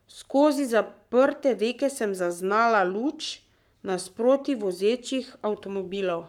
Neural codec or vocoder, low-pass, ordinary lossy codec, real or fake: codec, 44.1 kHz, 7.8 kbps, Pupu-Codec; 19.8 kHz; none; fake